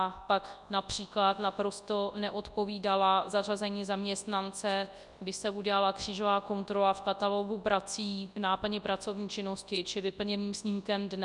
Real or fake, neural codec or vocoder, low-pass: fake; codec, 24 kHz, 0.9 kbps, WavTokenizer, large speech release; 10.8 kHz